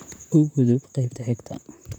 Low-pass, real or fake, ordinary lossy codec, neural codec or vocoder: 19.8 kHz; fake; none; vocoder, 44.1 kHz, 128 mel bands, Pupu-Vocoder